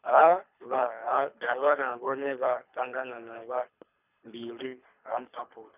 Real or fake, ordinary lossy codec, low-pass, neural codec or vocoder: fake; none; 3.6 kHz; codec, 24 kHz, 3 kbps, HILCodec